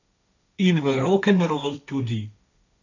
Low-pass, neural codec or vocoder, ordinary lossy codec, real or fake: 7.2 kHz; codec, 16 kHz, 1.1 kbps, Voila-Tokenizer; none; fake